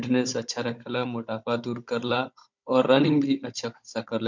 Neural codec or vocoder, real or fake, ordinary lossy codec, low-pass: codec, 16 kHz, 16 kbps, FunCodec, trained on Chinese and English, 50 frames a second; fake; MP3, 64 kbps; 7.2 kHz